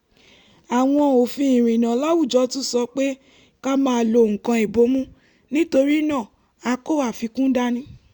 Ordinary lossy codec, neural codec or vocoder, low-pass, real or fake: Opus, 64 kbps; none; 19.8 kHz; real